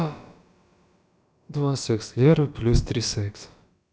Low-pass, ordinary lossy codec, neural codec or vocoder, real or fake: none; none; codec, 16 kHz, about 1 kbps, DyCAST, with the encoder's durations; fake